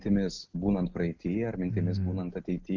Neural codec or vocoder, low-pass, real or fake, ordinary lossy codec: none; 7.2 kHz; real; Opus, 32 kbps